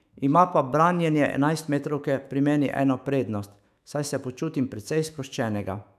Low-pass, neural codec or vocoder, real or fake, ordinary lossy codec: 14.4 kHz; autoencoder, 48 kHz, 128 numbers a frame, DAC-VAE, trained on Japanese speech; fake; none